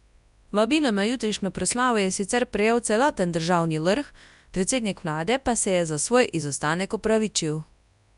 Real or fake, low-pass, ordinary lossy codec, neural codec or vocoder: fake; 10.8 kHz; none; codec, 24 kHz, 0.9 kbps, WavTokenizer, large speech release